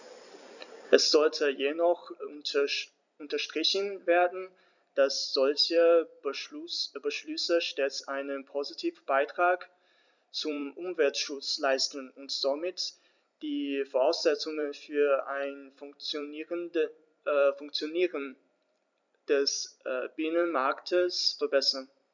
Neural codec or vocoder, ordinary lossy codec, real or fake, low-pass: vocoder, 44.1 kHz, 128 mel bands every 256 samples, BigVGAN v2; none; fake; 7.2 kHz